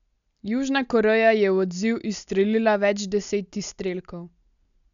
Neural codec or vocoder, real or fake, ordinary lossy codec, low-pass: none; real; none; 7.2 kHz